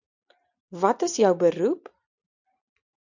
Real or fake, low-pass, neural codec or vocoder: real; 7.2 kHz; none